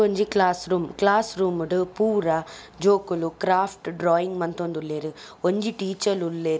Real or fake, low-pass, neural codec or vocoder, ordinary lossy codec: real; none; none; none